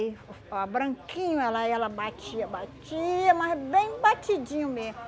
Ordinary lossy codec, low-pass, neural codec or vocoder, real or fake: none; none; none; real